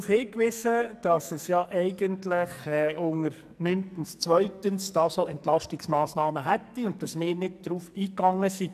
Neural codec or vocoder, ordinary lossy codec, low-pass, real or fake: codec, 44.1 kHz, 2.6 kbps, SNAC; none; 14.4 kHz; fake